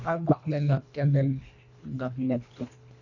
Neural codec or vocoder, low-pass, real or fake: codec, 24 kHz, 1.5 kbps, HILCodec; 7.2 kHz; fake